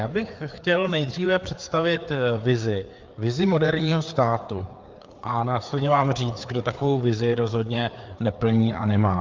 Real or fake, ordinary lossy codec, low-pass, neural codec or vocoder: fake; Opus, 32 kbps; 7.2 kHz; codec, 16 kHz, 4 kbps, FreqCodec, larger model